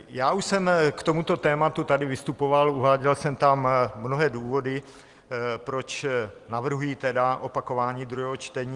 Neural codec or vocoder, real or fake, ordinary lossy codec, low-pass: none; real; Opus, 24 kbps; 10.8 kHz